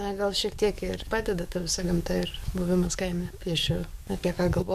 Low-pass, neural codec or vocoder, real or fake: 14.4 kHz; codec, 44.1 kHz, 7.8 kbps, DAC; fake